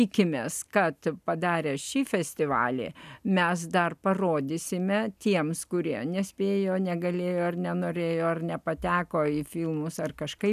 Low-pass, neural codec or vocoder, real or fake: 14.4 kHz; none; real